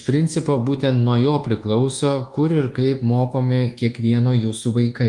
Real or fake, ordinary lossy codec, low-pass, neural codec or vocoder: fake; Opus, 24 kbps; 10.8 kHz; codec, 24 kHz, 1.2 kbps, DualCodec